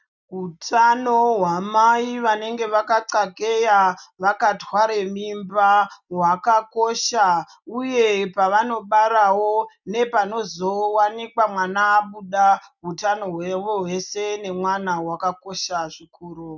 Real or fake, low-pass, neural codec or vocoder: real; 7.2 kHz; none